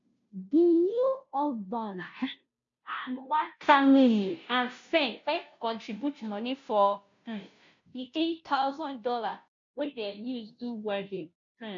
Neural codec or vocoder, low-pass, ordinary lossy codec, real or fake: codec, 16 kHz, 0.5 kbps, FunCodec, trained on Chinese and English, 25 frames a second; 7.2 kHz; MP3, 64 kbps; fake